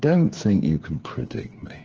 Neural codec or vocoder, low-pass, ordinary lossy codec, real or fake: codec, 16 kHz, 8 kbps, FreqCodec, smaller model; 7.2 kHz; Opus, 16 kbps; fake